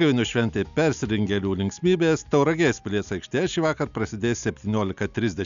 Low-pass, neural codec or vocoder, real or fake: 7.2 kHz; none; real